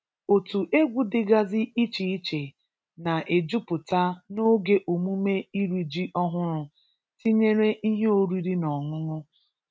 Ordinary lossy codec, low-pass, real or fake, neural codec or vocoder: none; none; real; none